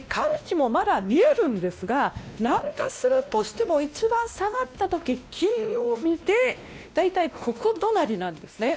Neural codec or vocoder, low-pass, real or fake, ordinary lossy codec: codec, 16 kHz, 1 kbps, X-Codec, WavLM features, trained on Multilingual LibriSpeech; none; fake; none